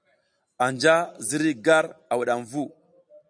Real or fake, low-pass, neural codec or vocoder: real; 9.9 kHz; none